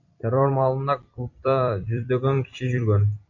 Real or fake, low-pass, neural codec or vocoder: fake; 7.2 kHz; vocoder, 44.1 kHz, 128 mel bands every 256 samples, BigVGAN v2